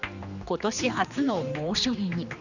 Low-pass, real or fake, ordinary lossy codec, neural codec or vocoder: 7.2 kHz; fake; none; codec, 16 kHz, 2 kbps, X-Codec, HuBERT features, trained on general audio